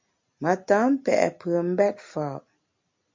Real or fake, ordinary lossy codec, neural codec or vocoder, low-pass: real; MP3, 64 kbps; none; 7.2 kHz